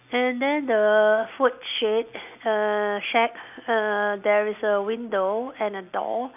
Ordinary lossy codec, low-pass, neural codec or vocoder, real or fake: none; 3.6 kHz; none; real